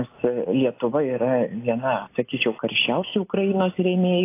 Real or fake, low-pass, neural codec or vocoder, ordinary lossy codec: real; 3.6 kHz; none; AAC, 24 kbps